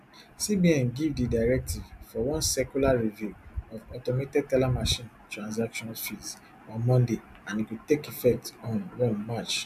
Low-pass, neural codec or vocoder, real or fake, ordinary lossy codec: 14.4 kHz; none; real; none